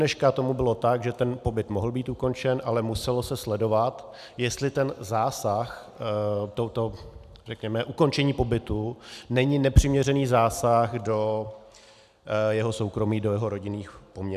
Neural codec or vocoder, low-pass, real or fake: none; 14.4 kHz; real